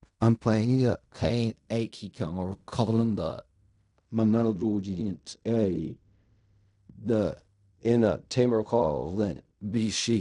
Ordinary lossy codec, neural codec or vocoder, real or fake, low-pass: none; codec, 16 kHz in and 24 kHz out, 0.4 kbps, LongCat-Audio-Codec, fine tuned four codebook decoder; fake; 10.8 kHz